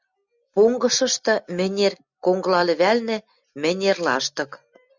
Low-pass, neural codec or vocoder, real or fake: 7.2 kHz; none; real